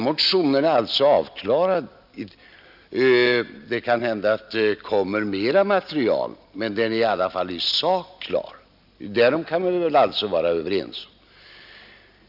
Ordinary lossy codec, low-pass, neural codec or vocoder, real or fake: none; 5.4 kHz; none; real